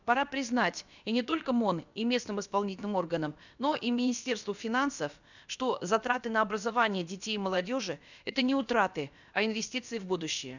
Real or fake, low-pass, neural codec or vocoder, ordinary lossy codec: fake; 7.2 kHz; codec, 16 kHz, about 1 kbps, DyCAST, with the encoder's durations; none